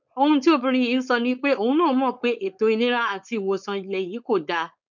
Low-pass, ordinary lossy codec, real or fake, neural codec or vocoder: 7.2 kHz; none; fake; codec, 16 kHz, 4.8 kbps, FACodec